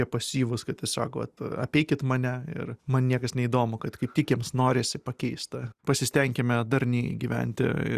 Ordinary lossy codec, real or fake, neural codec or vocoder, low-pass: Opus, 64 kbps; real; none; 14.4 kHz